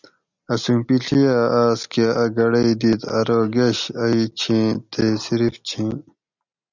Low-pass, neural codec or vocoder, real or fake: 7.2 kHz; none; real